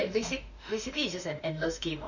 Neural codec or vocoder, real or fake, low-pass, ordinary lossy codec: autoencoder, 48 kHz, 32 numbers a frame, DAC-VAE, trained on Japanese speech; fake; 7.2 kHz; none